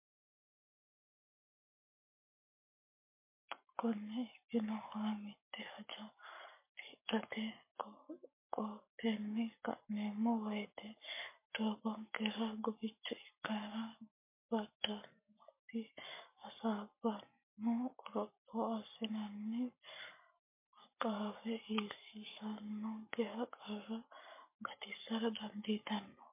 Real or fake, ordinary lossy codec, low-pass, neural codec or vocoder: fake; MP3, 24 kbps; 3.6 kHz; codec, 44.1 kHz, 7.8 kbps, Pupu-Codec